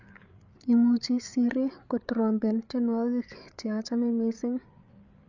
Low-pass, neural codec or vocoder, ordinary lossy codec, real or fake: 7.2 kHz; codec, 16 kHz, 8 kbps, FreqCodec, larger model; none; fake